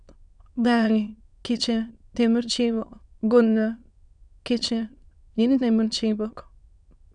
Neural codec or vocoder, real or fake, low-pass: autoencoder, 22.05 kHz, a latent of 192 numbers a frame, VITS, trained on many speakers; fake; 9.9 kHz